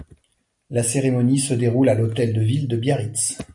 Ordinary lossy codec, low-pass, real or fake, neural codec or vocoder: MP3, 96 kbps; 10.8 kHz; real; none